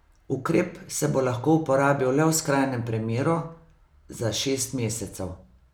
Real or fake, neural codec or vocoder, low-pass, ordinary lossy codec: real; none; none; none